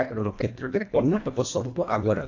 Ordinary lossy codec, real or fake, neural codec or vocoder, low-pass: AAC, 48 kbps; fake; codec, 24 kHz, 1.5 kbps, HILCodec; 7.2 kHz